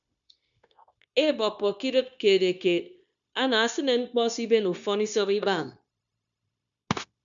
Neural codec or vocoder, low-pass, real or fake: codec, 16 kHz, 0.9 kbps, LongCat-Audio-Codec; 7.2 kHz; fake